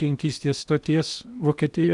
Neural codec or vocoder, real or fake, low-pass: codec, 16 kHz in and 24 kHz out, 0.8 kbps, FocalCodec, streaming, 65536 codes; fake; 10.8 kHz